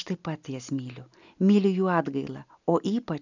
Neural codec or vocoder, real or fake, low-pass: none; real; 7.2 kHz